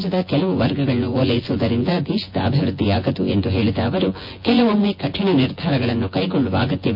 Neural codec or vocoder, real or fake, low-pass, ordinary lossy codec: vocoder, 24 kHz, 100 mel bands, Vocos; fake; 5.4 kHz; MP3, 32 kbps